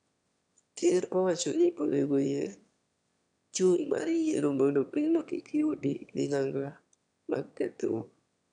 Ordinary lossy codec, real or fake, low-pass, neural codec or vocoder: none; fake; 9.9 kHz; autoencoder, 22.05 kHz, a latent of 192 numbers a frame, VITS, trained on one speaker